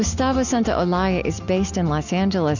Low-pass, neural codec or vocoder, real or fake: 7.2 kHz; none; real